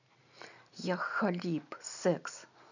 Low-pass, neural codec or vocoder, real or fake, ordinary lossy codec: 7.2 kHz; none; real; none